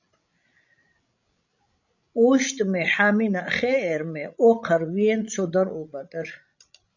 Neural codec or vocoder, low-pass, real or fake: codec, 16 kHz, 16 kbps, FreqCodec, larger model; 7.2 kHz; fake